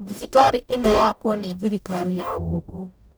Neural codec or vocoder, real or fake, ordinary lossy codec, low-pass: codec, 44.1 kHz, 0.9 kbps, DAC; fake; none; none